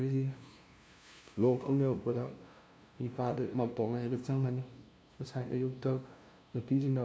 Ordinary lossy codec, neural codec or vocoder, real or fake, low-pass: none; codec, 16 kHz, 0.5 kbps, FunCodec, trained on LibriTTS, 25 frames a second; fake; none